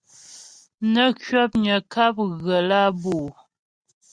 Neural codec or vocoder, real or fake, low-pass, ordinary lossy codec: none; real; 9.9 kHz; Opus, 64 kbps